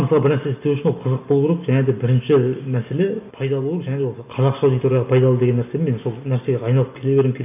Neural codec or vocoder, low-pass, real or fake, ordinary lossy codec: none; 3.6 kHz; real; none